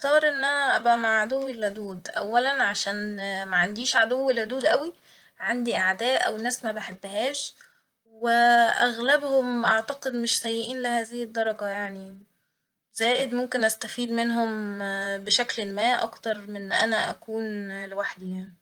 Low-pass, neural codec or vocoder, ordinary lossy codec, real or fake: 19.8 kHz; vocoder, 44.1 kHz, 128 mel bands, Pupu-Vocoder; Opus, 32 kbps; fake